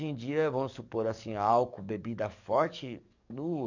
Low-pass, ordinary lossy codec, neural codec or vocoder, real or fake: 7.2 kHz; none; codec, 44.1 kHz, 7.8 kbps, DAC; fake